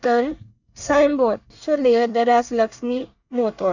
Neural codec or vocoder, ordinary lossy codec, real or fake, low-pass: codec, 24 kHz, 1 kbps, SNAC; none; fake; 7.2 kHz